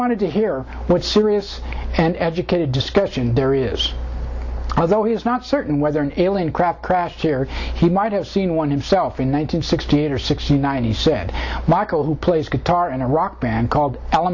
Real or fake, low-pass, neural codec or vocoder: real; 7.2 kHz; none